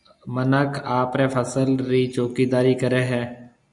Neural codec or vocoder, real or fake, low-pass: none; real; 10.8 kHz